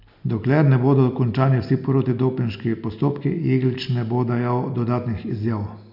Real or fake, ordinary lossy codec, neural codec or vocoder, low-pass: real; none; none; 5.4 kHz